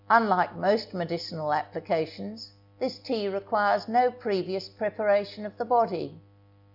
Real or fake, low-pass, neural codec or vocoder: real; 5.4 kHz; none